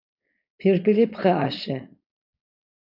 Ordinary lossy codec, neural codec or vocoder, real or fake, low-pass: AAC, 48 kbps; codec, 16 kHz, 4.8 kbps, FACodec; fake; 5.4 kHz